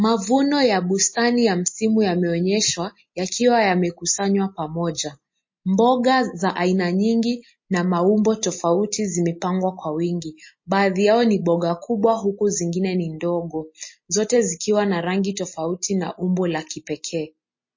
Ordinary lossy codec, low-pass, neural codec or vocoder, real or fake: MP3, 32 kbps; 7.2 kHz; none; real